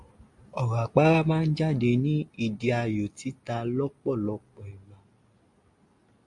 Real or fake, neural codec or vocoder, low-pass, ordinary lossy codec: real; none; 10.8 kHz; AAC, 64 kbps